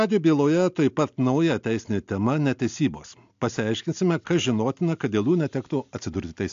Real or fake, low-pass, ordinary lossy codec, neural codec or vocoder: real; 7.2 kHz; AAC, 64 kbps; none